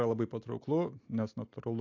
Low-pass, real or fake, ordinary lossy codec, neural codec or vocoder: 7.2 kHz; real; AAC, 48 kbps; none